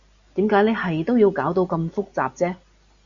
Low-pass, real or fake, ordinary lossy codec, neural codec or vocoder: 7.2 kHz; real; Opus, 64 kbps; none